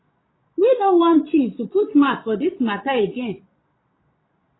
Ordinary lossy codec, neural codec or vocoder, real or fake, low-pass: AAC, 16 kbps; vocoder, 22.05 kHz, 80 mel bands, Vocos; fake; 7.2 kHz